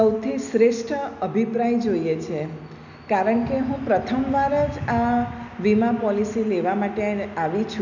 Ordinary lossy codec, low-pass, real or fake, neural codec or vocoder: none; 7.2 kHz; real; none